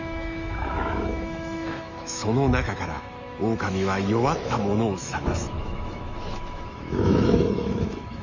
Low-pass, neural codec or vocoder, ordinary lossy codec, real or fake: 7.2 kHz; autoencoder, 48 kHz, 128 numbers a frame, DAC-VAE, trained on Japanese speech; none; fake